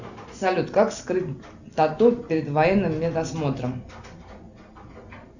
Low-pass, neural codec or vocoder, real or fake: 7.2 kHz; none; real